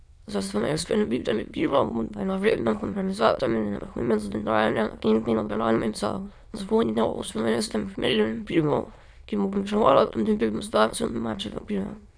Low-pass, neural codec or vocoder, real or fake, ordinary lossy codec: none; autoencoder, 22.05 kHz, a latent of 192 numbers a frame, VITS, trained on many speakers; fake; none